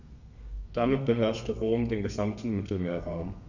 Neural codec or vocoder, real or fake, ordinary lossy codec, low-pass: codec, 32 kHz, 1.9 kbps, SNAC; fake; none; 7.2 kHz